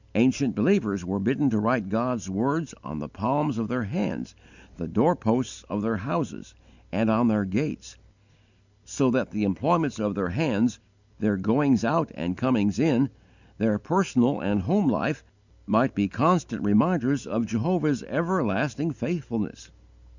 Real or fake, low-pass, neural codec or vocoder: real; 7.2 kHz; none